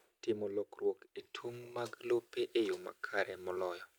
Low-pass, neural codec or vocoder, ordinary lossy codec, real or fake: none; none; none; real